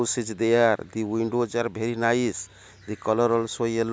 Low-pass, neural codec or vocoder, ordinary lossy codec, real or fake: none; none; none; real